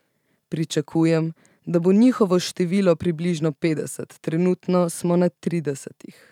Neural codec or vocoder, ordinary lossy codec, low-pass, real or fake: vocoder, 44.1 kHz, 128 mel bands every 512 samples, BigVGAN v2; none; 19.8 kHz; fake